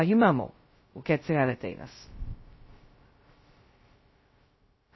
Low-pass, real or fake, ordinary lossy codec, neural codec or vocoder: 7.2 kHz; fake; MP3, 24 kbps; codec, 16 kHz, 0.2 kbps, FocalCodec